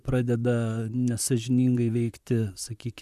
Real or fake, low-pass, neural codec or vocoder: fake; 14.4 kHz; vocoder, 44.1 kHz, 128 mel bands, Pupu-Vocoder